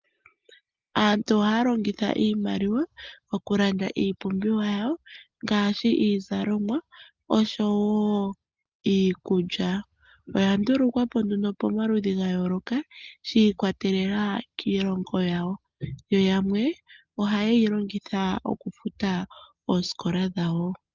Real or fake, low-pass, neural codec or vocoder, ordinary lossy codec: real; 7.2 kHz; none; Opus, 24 kbps